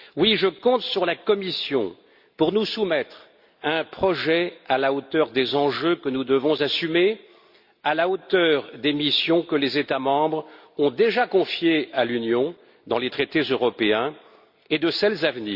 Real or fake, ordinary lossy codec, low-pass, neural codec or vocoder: real; Opus, 64 kbps; 5.4 kHz; none